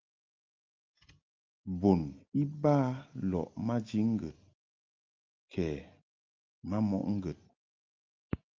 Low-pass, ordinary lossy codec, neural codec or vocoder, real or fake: 7.2 kHz; Opus, 24 kbps; none; real